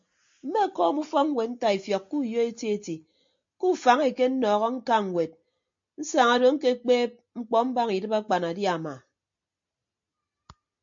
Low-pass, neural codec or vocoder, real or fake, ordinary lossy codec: 7.2 kHz; none; real; MP3, 48 kbps